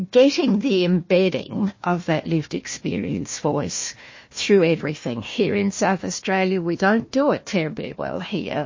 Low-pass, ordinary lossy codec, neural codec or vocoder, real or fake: 7.2 kHz; MP3, 32 kbps; codec, 16 kHz, 1 kbps, FunCodec, trained on Chinese and English, 50 frames a second; fake